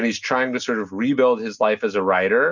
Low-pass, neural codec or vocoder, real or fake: 7.2 kHz; none; real